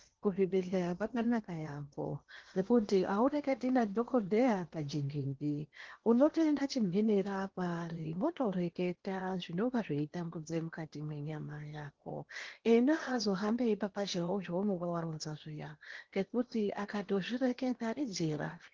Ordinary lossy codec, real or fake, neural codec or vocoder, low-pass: Opus, 16 kbps; fake; codec, 16 kHz in and 24 kHz out, 0.8 kbps, FocalCodec, streaming, 65536 codes; 7.2 kHz